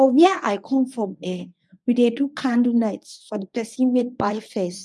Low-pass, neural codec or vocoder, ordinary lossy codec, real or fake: none; codec, 24 kHz, 0.9 kbps, WavTokenizer, medium speech release version 1; none; fake